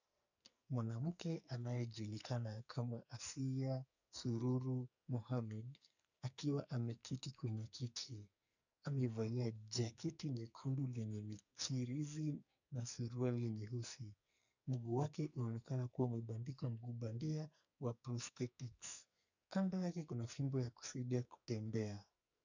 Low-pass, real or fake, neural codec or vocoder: 7.2 kHz; fake; codec, 44.1 kHz, 2.6 kbps, SNAC